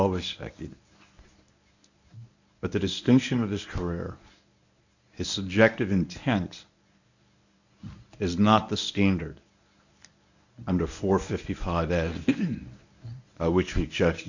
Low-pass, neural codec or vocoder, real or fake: 7.2 kHz; codec, 24 kHz, 0.9 kbps, WavTokenizer, medium speech release version 1; fake